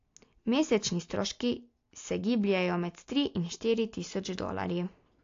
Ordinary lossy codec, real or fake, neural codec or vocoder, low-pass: AAC, 48 kbps; real; none; 7.2 kHz